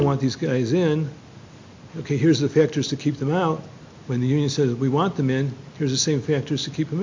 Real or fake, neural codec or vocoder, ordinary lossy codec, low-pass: real; none; MP3, 48 kbps; 7.2 kHz